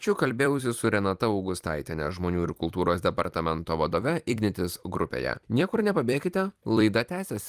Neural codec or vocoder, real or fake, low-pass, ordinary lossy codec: vocoder, 44.1 kHz, 128 mel bands every 256 samples, BigVGAN v2; fake; 14.4 kHz; Opus, 32 kbps